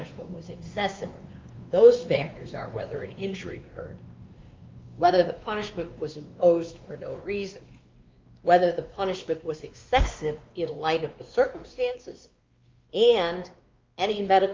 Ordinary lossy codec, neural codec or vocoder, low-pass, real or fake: Opus, 24 kbps; codec, 16 kHz, 2 kbps, X-Codec, WavLM features, trained on Multilingual LibriSpeech; 7.2 kHz; fake